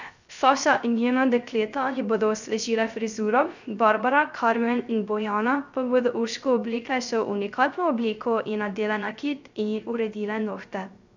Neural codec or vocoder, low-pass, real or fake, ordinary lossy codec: codec, 16 kHz, 0.3 kbps, FocalCodec; 7.2 kHz; fake; none